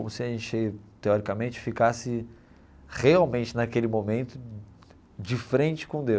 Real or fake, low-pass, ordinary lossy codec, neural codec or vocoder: real; none; none; none